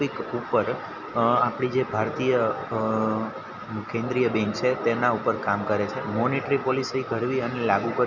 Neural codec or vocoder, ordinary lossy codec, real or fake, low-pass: none; none; real; 7.2 kHz